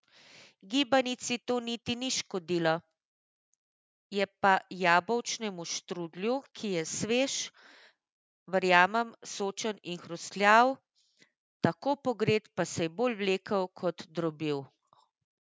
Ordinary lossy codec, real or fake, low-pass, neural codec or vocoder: none; real; none; none